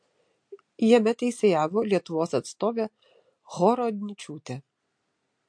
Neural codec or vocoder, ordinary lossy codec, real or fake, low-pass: none; MP3, 48 kbps; real; 9.9 kHz